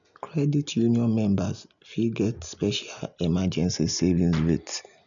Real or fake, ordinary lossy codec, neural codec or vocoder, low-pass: real; none; none; 7.2 kHz